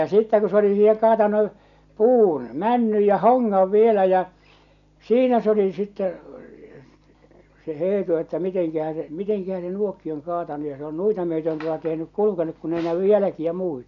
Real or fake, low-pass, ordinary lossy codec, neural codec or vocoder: real; 7.2 kHz; none; none